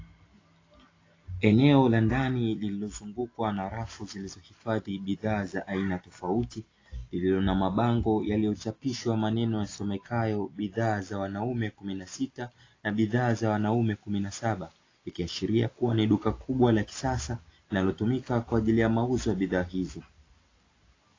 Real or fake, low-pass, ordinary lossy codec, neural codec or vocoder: real; 7.2 kHz; AAC, 32 kbps; none